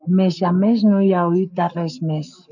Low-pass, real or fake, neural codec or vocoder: 7.2 kHz; fake; codec, 44.1 kHz, 7.8 kbps, Pupu-Codec